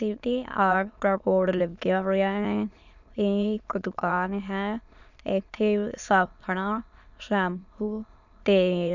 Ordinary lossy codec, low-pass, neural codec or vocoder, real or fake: none; 7.2 kHz; autoencoder, 22.05 kHz, a latent of 192 numbers a frame, VITS, trained on many speakers; fake